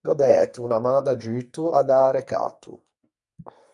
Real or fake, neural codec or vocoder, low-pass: fake; codec, 44.1 kHz, 2.6 kbps, SNAC; 10.8 kHz